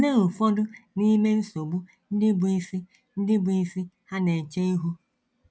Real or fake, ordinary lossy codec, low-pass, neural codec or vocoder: real; none; none; none